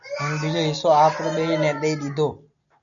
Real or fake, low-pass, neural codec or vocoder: real; 7.2 kHz; none